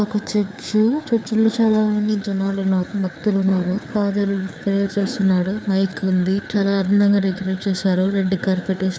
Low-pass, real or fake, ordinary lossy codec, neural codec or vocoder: none; fake; none; codec, 16 kHz, 4 kbps, FreqCodec, larger model